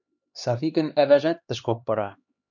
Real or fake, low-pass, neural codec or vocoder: fake; 7.2 kHz; codec, 16 kHz, 2 kbps, X-Codec, HuBERT features, trained on LibriSpeech